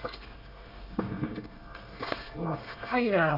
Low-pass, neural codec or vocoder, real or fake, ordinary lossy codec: 5.4 kHz; codec, 24 kHz, 1 kbps, SNAC; fake; none